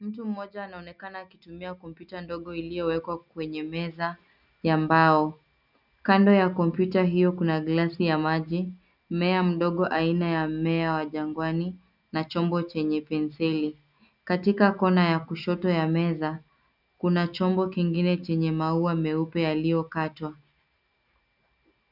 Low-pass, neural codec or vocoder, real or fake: 5.4 kHz; none; real